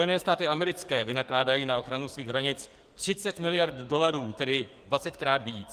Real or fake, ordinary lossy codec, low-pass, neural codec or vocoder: fake; Opus, 32 kbps; 14.4 kHz; codec, 44.1 kHz, 2.6 kbps, SNAC